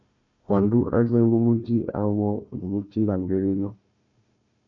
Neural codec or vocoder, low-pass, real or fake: codec, 16 kHz, 1 kbps, FunCodec, trained on Chinese and English, 50 frames a second; 7.2 kHz; fake